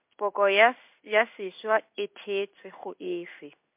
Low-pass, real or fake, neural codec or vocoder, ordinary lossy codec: 3.6 kHz; fake; codec, 16 kHz in and 24 kHz out, 1 kbps, XY-Tokenizer; MP3, 32 kbps